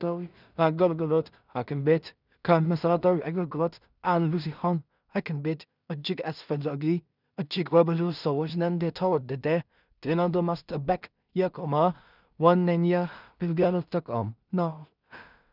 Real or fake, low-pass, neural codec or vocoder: fake; 5.4 kHz; codec, 16 kHz in and 24 kHz out, 0.4 kbps, LongCat-Audio-Codec, two codebook decoder